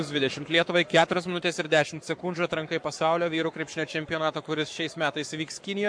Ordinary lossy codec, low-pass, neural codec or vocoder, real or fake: MP3, 64 kbps; 9.9 kHz; codec, 44.1 kHz, 7.8 kbps, Pupu-Codec; fake